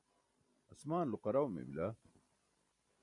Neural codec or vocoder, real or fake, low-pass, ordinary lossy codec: vocoder, 44.1 kHz, 128 mel bands every 512 samples, BigVGAN v2; fake; 10.8 kHz; MP3, 96 kbps